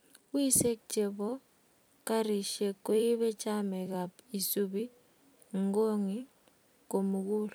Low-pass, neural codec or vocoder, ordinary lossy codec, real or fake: none; vocoder, 44.1 kHz, 128 mel bands every 256 samples, BigVGAN v2; none; fake